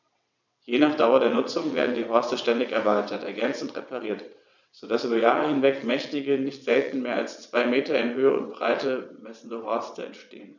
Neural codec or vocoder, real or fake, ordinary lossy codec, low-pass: vocoder, 22.05 kHz, 80 mel bands, WaveNeXt; fake; none; 7.2 kHz